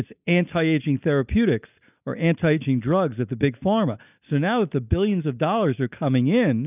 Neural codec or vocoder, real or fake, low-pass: none; real; 3.6 kHz